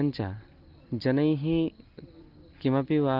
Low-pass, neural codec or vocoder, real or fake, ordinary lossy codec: 5.4 kHz; none; real; Opus, 24 kbps